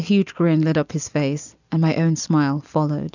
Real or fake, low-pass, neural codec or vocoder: real; 7.2 kHz; none